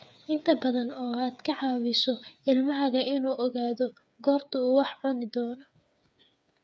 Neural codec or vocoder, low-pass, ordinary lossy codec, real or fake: codec, 16 kHz, 8 kbps, FreqCodec, smaller model; none; none; fake